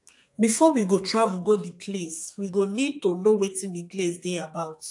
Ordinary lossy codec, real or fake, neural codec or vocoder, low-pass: AAC, 64 kbps; fake; codec, 32 kHz, 1.9 kbps, SNAC; 10.8 kHz